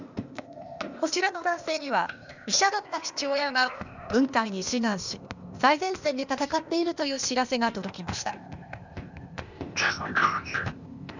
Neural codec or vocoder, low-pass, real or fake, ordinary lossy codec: codec, 16 kHz, 0.8 kbps, ZipCodec; 7.2 kHz; fake; none